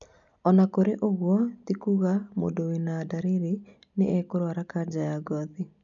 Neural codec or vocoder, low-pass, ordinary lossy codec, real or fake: none; 7.2 kHz; none; real